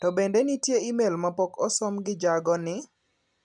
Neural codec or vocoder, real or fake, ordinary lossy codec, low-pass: none; real; none; 10.8 kHz